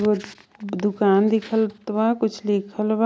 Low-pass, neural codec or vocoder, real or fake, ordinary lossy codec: none; none; real; none